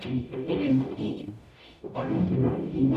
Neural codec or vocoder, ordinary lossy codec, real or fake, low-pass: codec, 44.1 kHz, 0.9 kbps, DAC; none; fake; 14.4 kHz